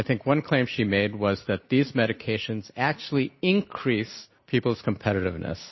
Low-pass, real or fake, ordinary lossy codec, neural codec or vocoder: 7.2 kHz; real; MP3, 24 kbps; none